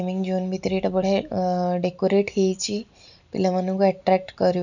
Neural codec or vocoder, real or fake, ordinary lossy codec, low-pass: none; real; none; 7.2 kHz